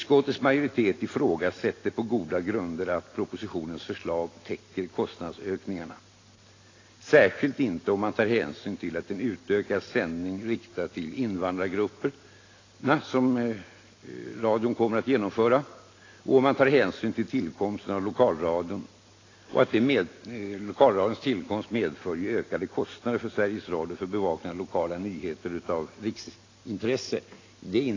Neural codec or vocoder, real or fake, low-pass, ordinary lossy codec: none; real; 7.2 kHz; AAC, 32 kbps